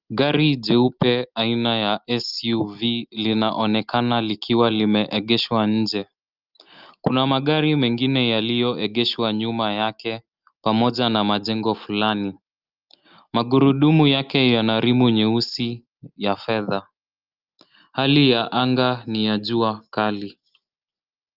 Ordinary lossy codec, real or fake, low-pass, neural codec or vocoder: Opus, 32 kbps; real; 5.4 kHz; none